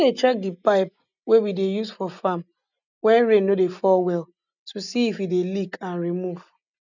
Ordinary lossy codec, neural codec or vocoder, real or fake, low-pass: none; none; real; 7.2 kHz